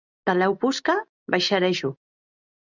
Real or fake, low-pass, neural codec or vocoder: real; 7.2 kHz; none